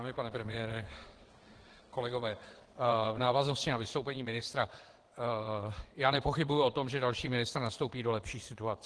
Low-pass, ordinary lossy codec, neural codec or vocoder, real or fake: 9.9 kHz; Opus, 16 kbps; vocoder, 22.05 kHz, 80 mel bands, WaveNeXt; fake